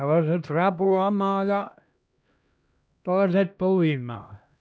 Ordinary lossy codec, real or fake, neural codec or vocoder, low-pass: none; fake; codec, 16 kHz, 1 kbps, X-Codec, HuBERT features, trained on LibriSpeech; none